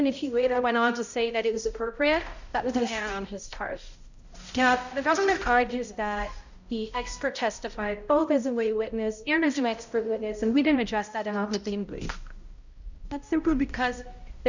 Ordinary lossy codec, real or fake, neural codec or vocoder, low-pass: Opus, 64 kbps; fake; codec, 16 kHz, 0.5 kbps, X-Codec, HuBERT features, trained on balanced general audio; 7.2 kHz